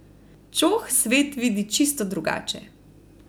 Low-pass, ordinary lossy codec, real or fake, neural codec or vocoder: none; none; real; none